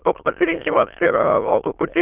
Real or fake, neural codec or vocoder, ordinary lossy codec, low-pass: fake; autoencoder, 22.05 kHz, a latent of 192 numbers a frame, VITS, trained on many speakers; Opus, 32 kbps; 3.6 kHz